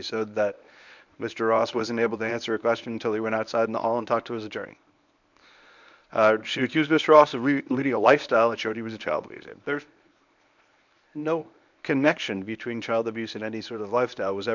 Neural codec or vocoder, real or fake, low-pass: codec, 24 kHz, 0.9 kbps, WavTokenizer, medium speech release version 1; fake; 7.2 kHz